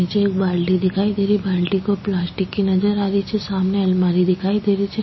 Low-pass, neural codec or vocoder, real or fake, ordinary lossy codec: 7.2 kHz; none; real; MP3, 24 kbps